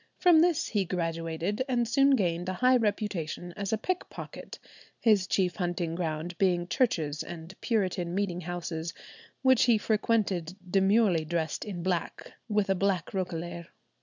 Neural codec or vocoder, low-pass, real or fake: none; 7.2 kHz; real